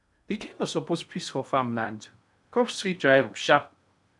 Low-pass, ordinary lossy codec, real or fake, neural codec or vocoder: 10.8 kHz; none; fake; codec, 16 kHz in and 24 kHz out, 0.8 kbps, FocalCodec, streaming, 65536 codes